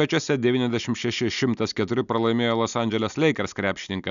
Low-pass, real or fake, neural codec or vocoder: 7.2 kHz; real; none